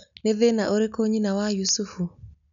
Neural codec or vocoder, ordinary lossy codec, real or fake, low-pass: none; none; real; 7.2 kHz